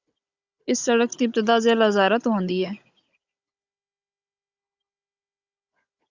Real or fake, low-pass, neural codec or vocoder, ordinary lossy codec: fake; 7.2 kHz; codec, 16 kHz, 16 kbps, FunCodec, trained on Chinese and English, 50 frames a second; Opus, 64 kbps